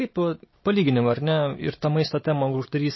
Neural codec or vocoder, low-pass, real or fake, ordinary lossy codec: none; 7.2 kHz; real; MP3, 24 kbps